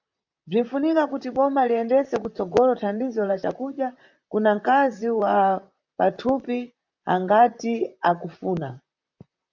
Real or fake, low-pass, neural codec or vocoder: fake; 7.2 kHz; vocoder, 44.1 kHz, 128 mel bands, Pupu-Vocoder